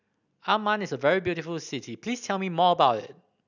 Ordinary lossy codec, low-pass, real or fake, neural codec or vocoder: none; 7.2 kHz; real; none